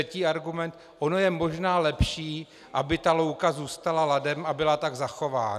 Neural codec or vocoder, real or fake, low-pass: none; real; 14.4 kHz